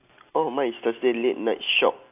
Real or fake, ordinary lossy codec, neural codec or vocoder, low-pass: real; AAC, 32 kbps; none; 3.6 kHz